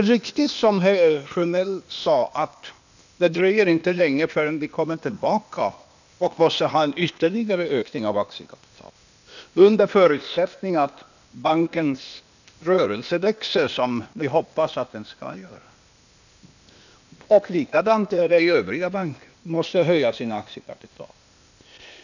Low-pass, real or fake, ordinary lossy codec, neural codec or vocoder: 7.2 kHz; fake; none; codec, 16 kHz, 0.8 kbps, ZipCodec